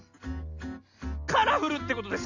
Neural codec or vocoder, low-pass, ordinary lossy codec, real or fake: none; 7.2 kHz; none; real